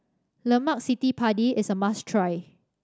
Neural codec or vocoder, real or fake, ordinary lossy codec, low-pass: none; real; none; none